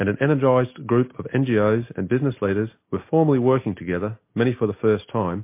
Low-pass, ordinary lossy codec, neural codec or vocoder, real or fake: 3.6 kHz; MP3, 24 kbps; none; real